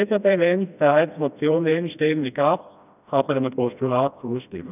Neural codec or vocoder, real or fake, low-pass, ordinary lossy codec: codec, 16 kHz, 1 kbps, FreqCodec, smaller model; fake; 3.6 kHz; none